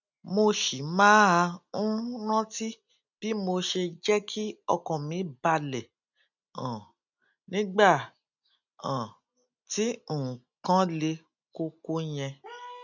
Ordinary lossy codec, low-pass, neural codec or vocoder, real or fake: none; 7.2 kHz; none; real